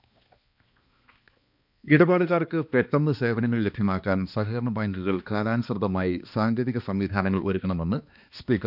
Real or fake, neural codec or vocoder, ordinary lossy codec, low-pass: fake; codec, 16 kHz, 2 kbps, X-Codec, HuBERT features, trained on balanced general audio; none; 5.4 kHz